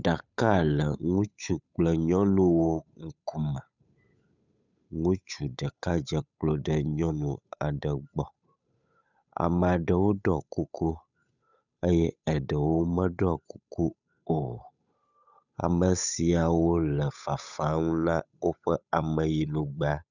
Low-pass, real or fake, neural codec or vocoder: 7.2 kHz; fake; codec, 16 kHz, 8 kbps, FunCodec, trained on Chinese and English, 25 frames a second